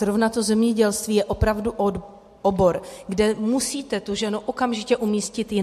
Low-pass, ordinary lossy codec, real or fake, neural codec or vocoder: 14.4 kHz; MP3, 64 kbps; real; none